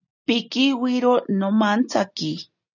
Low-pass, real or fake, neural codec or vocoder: 7.2 kHz; real; none